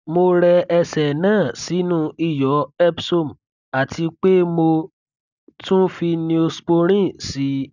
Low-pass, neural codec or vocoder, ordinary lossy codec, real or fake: 7.2 kHz; none; none; real